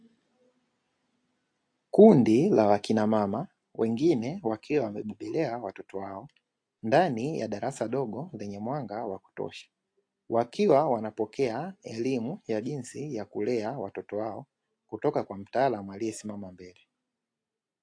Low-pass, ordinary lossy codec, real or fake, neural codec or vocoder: 9.9 kHz; AAC, 48 kbps; real; none